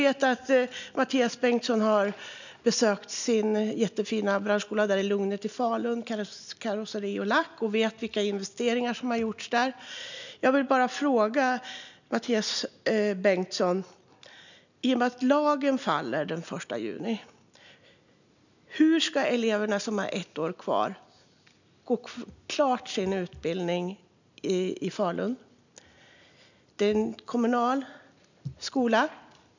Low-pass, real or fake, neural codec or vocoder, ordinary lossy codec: 7.2 kHz; real; none; none